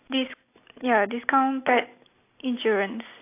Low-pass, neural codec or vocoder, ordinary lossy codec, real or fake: 3.6 kHz; none; AAC, 24 kbps; real